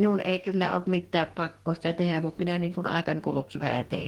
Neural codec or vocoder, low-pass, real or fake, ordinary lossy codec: codec, 44.1 kHz, 2.6 kbps, DAC; 19.8 kHz; fake; Opus, 24 kbps